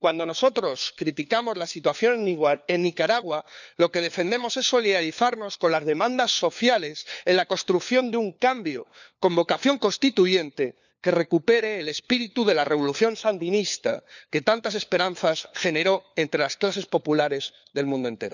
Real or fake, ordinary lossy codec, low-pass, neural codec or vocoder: fake; none; 7.2 kHz; codec, 16 kHz, 4 kbps, FunCodec, trained on LibriTTS, 50 frames a second